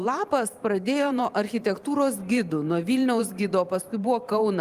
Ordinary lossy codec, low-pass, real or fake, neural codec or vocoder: Opus, 32 kbps; 14.4 kHz; fake; vocoder, 44.1 kHz, 128 mel bands every 512 samples, BigVGAN v2